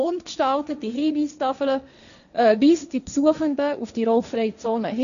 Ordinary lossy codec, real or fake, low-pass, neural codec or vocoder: none; fake; 7.2 kHz; codec, 16 kHz, 1.1 kbps, Voila-Tokenizer